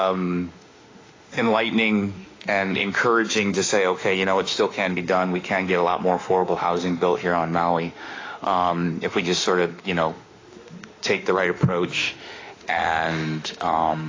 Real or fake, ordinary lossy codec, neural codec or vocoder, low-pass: fake; AAC, 32 kbps; autoencoder, 48 kHz, 32 numbers a frame, DAC-VAE, trained on Japanese speech; 7.2 kHz